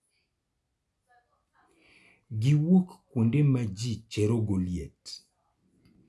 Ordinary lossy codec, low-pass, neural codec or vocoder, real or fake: none; none; none; real